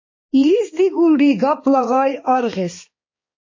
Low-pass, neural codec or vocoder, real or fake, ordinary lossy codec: 7.2 kHz; codec, 16 kHz, 2 kbps, X-Codec, HuBERT features, trained on balanced general audio; fake; MP3, 32 kbps